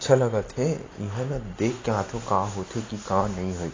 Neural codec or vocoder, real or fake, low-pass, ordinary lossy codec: none; real; 7.2 kHz; AAC, 32 kbps